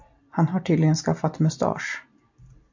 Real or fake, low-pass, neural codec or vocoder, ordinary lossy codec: real; 7.2 kHz; none; MP3, 64 kbps